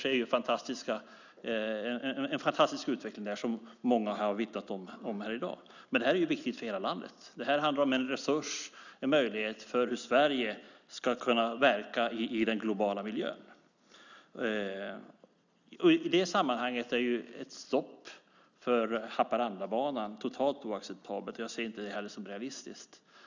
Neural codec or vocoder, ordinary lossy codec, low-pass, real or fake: none; none; 7.2 kHz; real